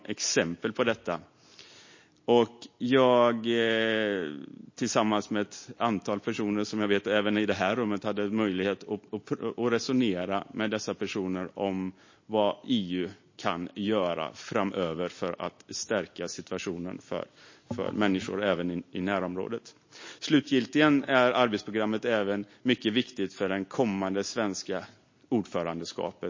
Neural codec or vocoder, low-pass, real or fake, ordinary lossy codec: none; 7.2 kHz; real; MP3, 32 kbps